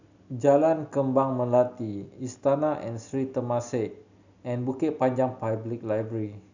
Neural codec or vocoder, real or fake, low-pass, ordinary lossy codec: none; real; 7.2 kHz; none